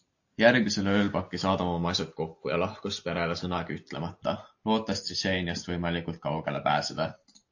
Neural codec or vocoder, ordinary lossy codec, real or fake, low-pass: none; AAC, 48 kbps; real; 7.2 kHz